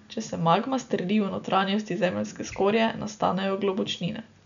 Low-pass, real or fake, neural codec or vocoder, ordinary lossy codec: 7.2 kHz; real; none; none